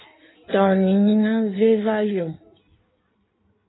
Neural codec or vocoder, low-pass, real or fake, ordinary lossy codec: codec, 16 kHz in and 24 kHz out, 1.1 kbps, FireRedTTS-2 codec; 7.2 kHz; fake; AAC, 16 kbps